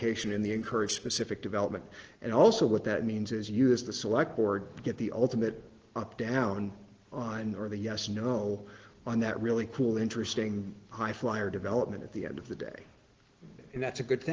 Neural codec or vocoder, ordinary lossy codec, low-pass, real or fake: none; Opus, 16 kbps; 7.2 kHz; real